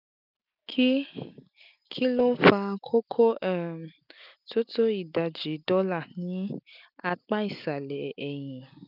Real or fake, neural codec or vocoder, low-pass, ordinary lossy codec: real; none; 5.4 kHz; none